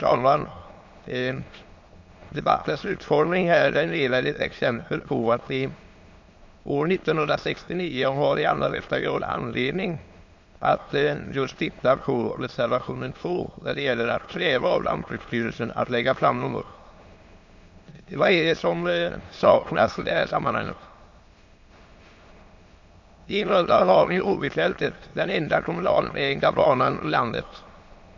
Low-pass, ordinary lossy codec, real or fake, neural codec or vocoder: 7.2 kHz; MP3, 48 kbps; fake; autoencoder, 22.05 kHz, a latent of 192 numbers a frame, VITS, trained on many speakers